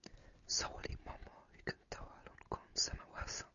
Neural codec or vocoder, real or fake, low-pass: none; real; 7.2 kHz